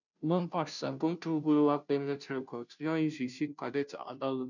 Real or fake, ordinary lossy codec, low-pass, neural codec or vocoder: fake; none; 7.2 kHz; codec, 16 kHz, 0.5 kbps, FunCodec, trained on Chinese and English, 25 frames a second